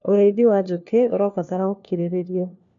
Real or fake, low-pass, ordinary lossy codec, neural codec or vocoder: fake; 7.2 kHz; none; codec, 16 kHz, 1 kbps, FunCodec, trained on LibriTTS, 50 frames a second